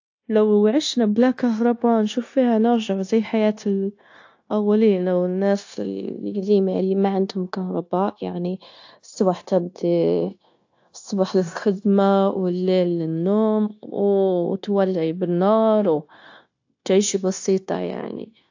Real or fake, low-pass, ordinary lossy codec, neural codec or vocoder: fake; 7.2 kHz; AAC, 48 kbps; codec, 16 kHz, 0.9 kbps, LongCat-Audio-Codec